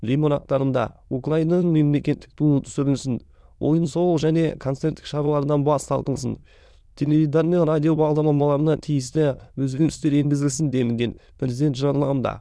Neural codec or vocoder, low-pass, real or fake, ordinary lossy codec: autoencoder, 22.05 kHz, a latent of 192 numbers a frame, VITS, trained on many speakers; none; fake; none